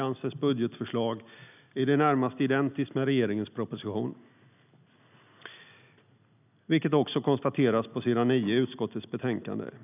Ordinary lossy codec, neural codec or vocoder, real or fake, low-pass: none; none; real; 3.6 kHz